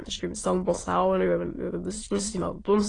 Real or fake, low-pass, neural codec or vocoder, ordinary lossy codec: fake; 9.9 kHz; autoencoder, 22.05 kHz, a latent of 192 numbers a frame, VITS, trained on many speakers; AAC, 32 kbps